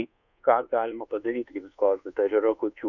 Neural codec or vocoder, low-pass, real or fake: codec, 24 kHz, 1.2 kbps, DualCodec; 7.2 kHz; fake